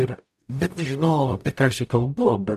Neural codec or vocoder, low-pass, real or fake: codec, 44.1 kHz, 0.9 kbps, DAC; 14.4 kHz; fake